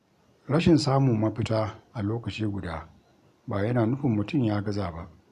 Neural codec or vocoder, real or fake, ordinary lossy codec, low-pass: none; real; AAC, 96 kbps; 14.4 kHz